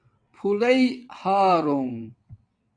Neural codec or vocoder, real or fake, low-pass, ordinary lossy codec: vocoder, 22.05 kHz, 80 mel bands, WaveNeXt; fake; 9.9 kHz; AAC, 64 kbps